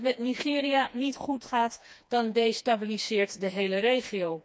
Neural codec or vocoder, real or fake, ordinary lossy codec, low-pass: codec, 16 kHz, 2 kbps, FreqCodec, smaller model; fake; none; none